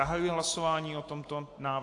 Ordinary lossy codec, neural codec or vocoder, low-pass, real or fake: AAC, 48 kbps; none; 10.8 kHz; real